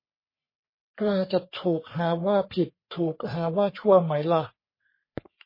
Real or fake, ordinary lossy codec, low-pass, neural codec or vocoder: fake; MP3, 24 kbps; 5.4 kHz; codec, 44.1 kHz, 3.4 kbps, Pupu-Codec